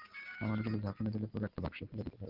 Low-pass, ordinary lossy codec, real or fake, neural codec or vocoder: 5.4 kHz; Opus, 32 kbps; real; none